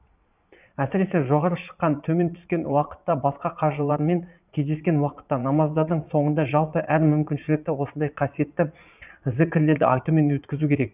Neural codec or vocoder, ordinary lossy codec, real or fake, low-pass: vocoder, 22.05 kHz, 80 mel bands, Vocos; none; fake; 3.6 kHz